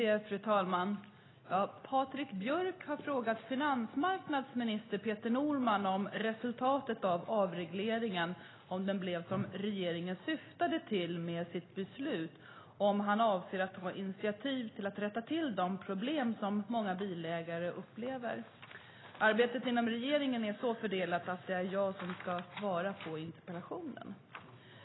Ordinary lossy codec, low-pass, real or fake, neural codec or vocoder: AAC, 16 kbps; 7.2 kHz; real; none